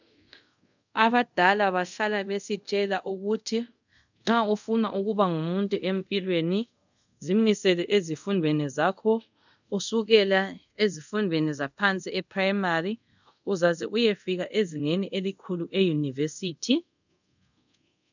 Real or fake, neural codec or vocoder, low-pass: fake; codec, 24 kHz, 0.5 kbps, DualCodec; 7.2 kHz